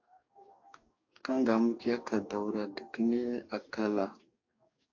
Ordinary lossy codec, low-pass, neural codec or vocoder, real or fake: AAC, 48 kbps; 7.2 kHz; codec, 44.1 kHz, 2.6 kbps, DAC; fake